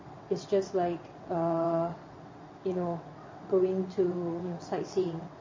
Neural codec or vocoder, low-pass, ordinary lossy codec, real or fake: vocoder, 22.05 kHz, 80 mel bands, WaveNeXt; 7.2 kHz; MP3, 32 kbps; fake